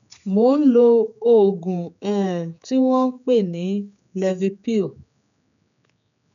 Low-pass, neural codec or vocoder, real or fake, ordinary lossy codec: 7.2 kHz; codec, 16 kHz, 4 kbps, X-Codec, HuBERT features, trained on general audio; fake; none